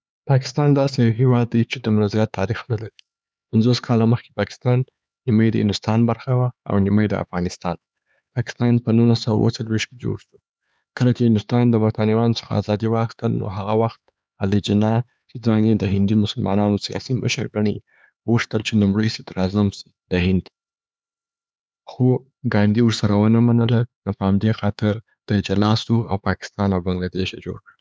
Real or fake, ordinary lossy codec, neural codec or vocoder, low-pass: fake; none; codec, 16 kHz, 4 kbps, X-Codec, HuBERT features, trained on LibriSpeech; none